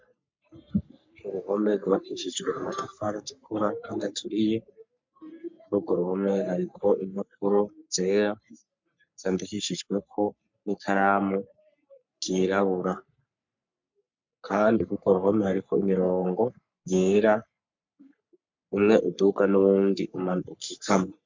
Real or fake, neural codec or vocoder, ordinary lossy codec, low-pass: fake; codec, 44.1 kHz, 3.4 kbps, Pupu-Codec; MP3, 64 kbps; 7.2 kHz